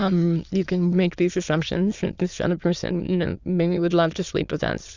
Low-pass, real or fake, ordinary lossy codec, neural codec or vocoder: 7.2 kHz; fake; Opus, 64 kbps; autoencoder, 22.05 kHz, a latent of 192 numbers a frame, VITS, trained on many speakers